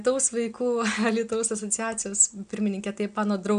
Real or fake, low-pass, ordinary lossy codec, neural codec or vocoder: real; 9.9 kHz; AAC, 96 kbps; none